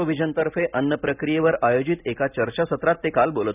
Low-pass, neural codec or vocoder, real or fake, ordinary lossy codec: 3.6 kHz; none; real; none